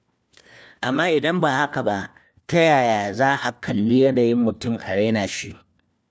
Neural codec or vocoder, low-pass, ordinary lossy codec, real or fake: codec, 16 kHz, 1 kbps, FunCodec, trained on LibriTTS, 50 frames a second; none; none; fake